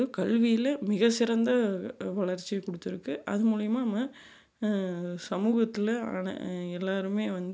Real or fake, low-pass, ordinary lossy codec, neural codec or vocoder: real; none; none; none